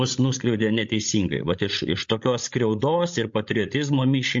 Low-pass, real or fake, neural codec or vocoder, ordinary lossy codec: 7.2 kHz; fake; codec, 16 kHz, 16 kbps, FreqCodec, larger model; MP3, 48 kbps